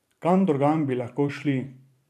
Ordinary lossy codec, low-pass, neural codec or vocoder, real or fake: none; 14.4 kHz; vocoder, 44.1 kHz, 128 mel bands every 512 samples, BigVGAN v2; fake